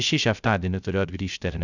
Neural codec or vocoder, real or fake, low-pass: codec, 16 kHz, 0.3 kbps, FocalCodec; fake; 7.2 kHz